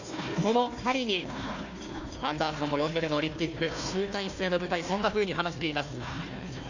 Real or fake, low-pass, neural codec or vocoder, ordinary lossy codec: fake; 7.2 kHz; codec, 16 kHz, 1 kbps, FunCodec, trained on Chinese and English, 50 frames a second; none